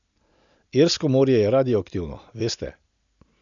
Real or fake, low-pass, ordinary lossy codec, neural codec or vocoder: real; 7.2 kHz; none; none